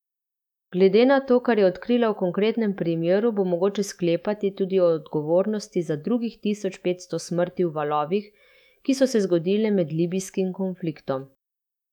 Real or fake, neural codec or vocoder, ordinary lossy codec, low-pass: fake; autoencoder, 48 kHz, 128 numbers a frame, DAC-VAE, trained on Japanese speech; none; 19.8 kHz